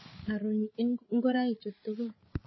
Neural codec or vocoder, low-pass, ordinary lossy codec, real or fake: codec, 16 kHz, 8 kbps, FreqCodec, larger model; 7.2 kHz; MP3, 24 kbps; fake